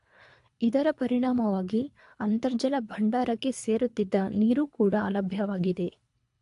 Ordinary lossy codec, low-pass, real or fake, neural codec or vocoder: AAC, 64 kbps; 10.8 kHz; fake; codec, 24 kHz, 3 kbps, HILCodec